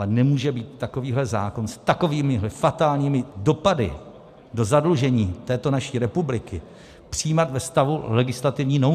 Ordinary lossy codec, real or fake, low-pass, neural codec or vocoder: AAC, 96 kbps; real; 14.4 kHz; none